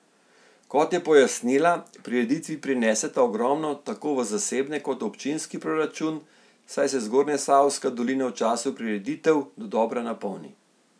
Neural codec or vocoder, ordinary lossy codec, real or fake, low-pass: none; none; real; none